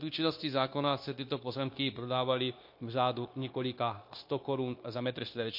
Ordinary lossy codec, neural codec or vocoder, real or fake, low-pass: MP3, 32 kbps; codec, 16 kHz, 0.9 kbps, LongCat-Audio-Codec; fake; 5.4 kHz